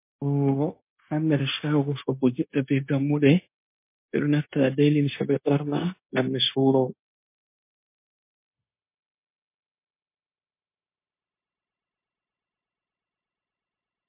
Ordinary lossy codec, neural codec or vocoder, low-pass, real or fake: MP3, 24 kbps; codec, 16 kHz, 0.9 kbps, LongCat-Audio-Codec; 3.6 kHz; fake